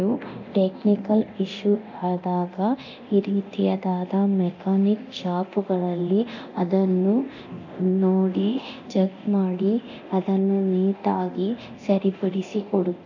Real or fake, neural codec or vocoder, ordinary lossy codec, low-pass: fake; codec, 24 kHz, 0.9 kbps, DualCodec; none; 7.2 kHz